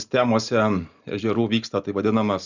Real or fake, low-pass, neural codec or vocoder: real; 7.2 kHz; none